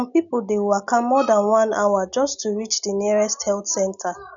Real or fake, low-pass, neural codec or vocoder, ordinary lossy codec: real; 7.2 kHz; none; none